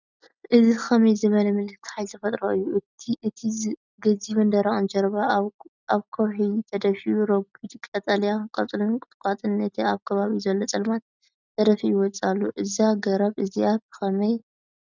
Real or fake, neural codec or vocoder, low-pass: real; none; 7.2 kHz